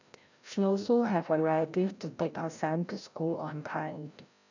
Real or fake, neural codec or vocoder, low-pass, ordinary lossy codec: fake; codec, 16 kHz, 0.5 kbps, FreqCodec, larger model; 7.2 kHz; none